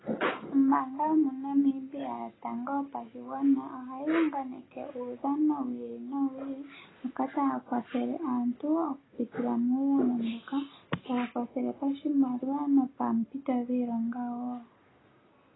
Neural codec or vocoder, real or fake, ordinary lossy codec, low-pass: none; real; AAC, 16 kbps; 7.2 kHz